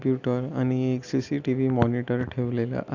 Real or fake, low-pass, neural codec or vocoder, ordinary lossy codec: real; 7.2 kHz; none; none